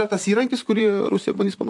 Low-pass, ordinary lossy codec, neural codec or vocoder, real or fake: 10.8 kHz; MP3, 64 kbps; vocoder, 44.1 kHz, 128 mel bands, Pupu-Vocoder; fake